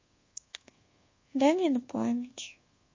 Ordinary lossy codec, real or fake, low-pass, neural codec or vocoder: MP3, 32 kbps; fake; 7.2 kHz; codec, 24 kHz, 1.2 kbps, DualCodec